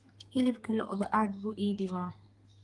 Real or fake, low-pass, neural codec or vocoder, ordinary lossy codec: fake; 10.8 kHz; codec, 32 kHz, 1.9 kbps, SNAC; Opus, 24 kbps